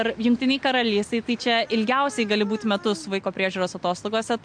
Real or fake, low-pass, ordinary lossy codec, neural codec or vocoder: real; 9.9 kHz; MP3, 64 kbps; none